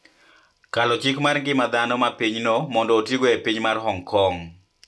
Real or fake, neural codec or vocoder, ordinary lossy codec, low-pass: real; none; none; none